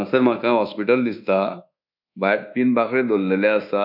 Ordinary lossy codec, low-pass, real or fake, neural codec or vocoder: none; 5.4 kHz; fake; codec, 24 kHz, 1.2 kbps, DualCodec